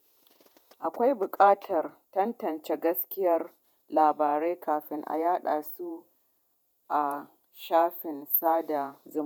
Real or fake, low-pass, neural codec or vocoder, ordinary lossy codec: fake; none; vocoder, 48 kHz, 128 mel bands, Vocos; none